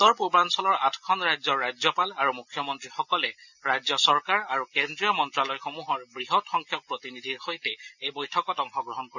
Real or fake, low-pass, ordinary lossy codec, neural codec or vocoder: real; 7.2 kHz; none; none